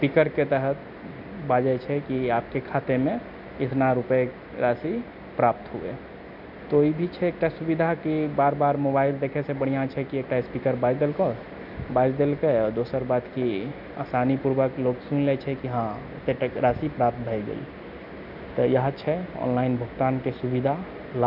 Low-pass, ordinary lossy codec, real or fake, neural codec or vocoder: 5.4 kHz; none; real; none